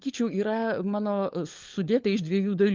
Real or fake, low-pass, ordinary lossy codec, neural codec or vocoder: fake; 7.2 kHz; Opus, 24 kbps; codec, 16 kHz, 4 kbps, FunCodec, trained on LibriTTS, 50 frames a second